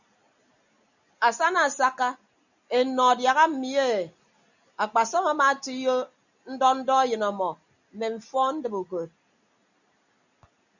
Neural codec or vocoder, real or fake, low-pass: none; real; 7.2 kHz